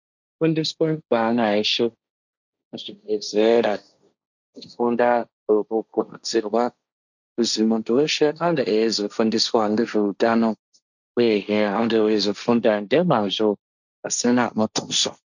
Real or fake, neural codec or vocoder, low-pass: fake; codec, 16 kHz, 1.1 kbps, Voila-Tokenizer; 7.2 kHz